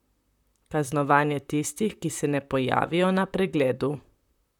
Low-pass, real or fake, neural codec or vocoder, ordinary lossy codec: 19.8 kHz; fake; vocoder, 44.1 kHz, 128 mel bands, Pupu-Vocoder; none